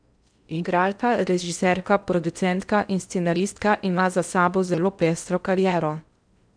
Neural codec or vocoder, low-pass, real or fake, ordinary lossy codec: codec, 16 kHz in and 24 kHz out, 0.6 kbps, FocalCodec, streaming, 4096 codes; 9.9 kHz; fake; none